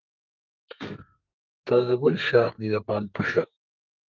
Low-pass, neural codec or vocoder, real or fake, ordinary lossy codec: 7.2 kHz; codec, 32 kHz, 1.9 kbps, SNAC; fake; Opus, 24 kbps